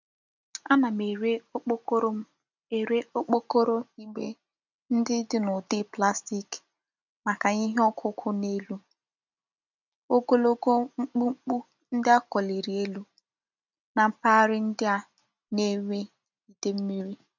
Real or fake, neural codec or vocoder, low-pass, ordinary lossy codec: real; none; 7.2 kHz; none